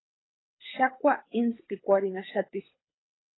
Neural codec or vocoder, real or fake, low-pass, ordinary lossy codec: codec, 24 kHz, 6 kbps, HILCodec; fake; 7.2 kHz; AAC, 16 kbps